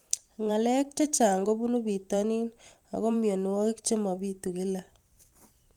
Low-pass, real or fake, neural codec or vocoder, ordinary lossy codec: 19.8 kHz; fake; vocoder, 44.1 kHz, 128 mel bands every 512 samples, BigVGAN v2; Opus, 32 kbps